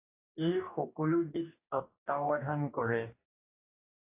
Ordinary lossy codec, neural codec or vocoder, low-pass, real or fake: AAC, 32 kbps; codec, 44.1 kHz, 2.6 kbps, DAC; 3.6 kHz; fake